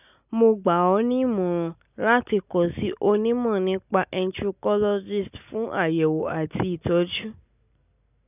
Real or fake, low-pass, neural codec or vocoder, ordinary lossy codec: real; 3.6 kHz; none; none